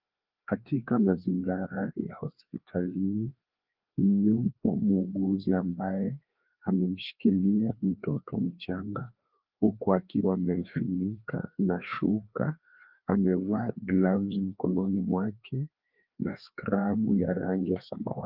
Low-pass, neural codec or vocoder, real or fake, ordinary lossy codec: 5.4 kHz; codec, 16 kHz, 2 kbps, FreqCodec, larger model; fake; Opus, 32 kbps